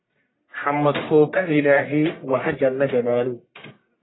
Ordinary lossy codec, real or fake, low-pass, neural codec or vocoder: AAC, 16 kbps; fake; 7.2 kHz; codec, 44.1 kHz, 1.7 kbps, Pupu-Codec